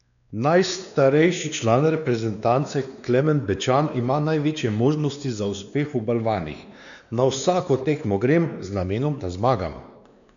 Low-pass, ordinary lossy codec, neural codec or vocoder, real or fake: 7.2 kHz; none; codec, 16 kHz, 2 kbps, X-Codec, WavLM features, trained on Multilingual LibriSpeech; fake